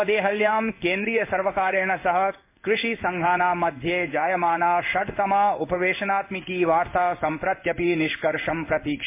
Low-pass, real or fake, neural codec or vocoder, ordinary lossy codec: 3.6 kHz; fake; codec, 16 kHz in and 24 kHz out, 1 kbps, XY-Tokenizer; MP3, 24 kbps